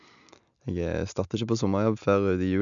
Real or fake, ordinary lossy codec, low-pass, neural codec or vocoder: real; none; 7.2 kHz; none